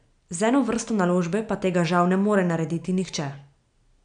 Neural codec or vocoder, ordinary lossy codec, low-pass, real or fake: none; none; 9.9 kHz; real